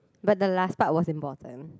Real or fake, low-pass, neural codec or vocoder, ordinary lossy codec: real; none; none; none